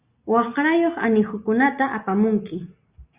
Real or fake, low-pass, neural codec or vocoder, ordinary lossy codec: real; 3.6 kHz; none; Opus, 64 kbps